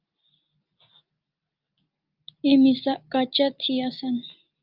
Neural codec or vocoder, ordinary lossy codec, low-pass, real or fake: none; Opus, 24 kbps; 5.4 kHz; real